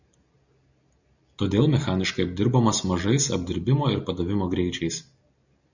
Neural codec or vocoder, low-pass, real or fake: none; 7.2 kHz; real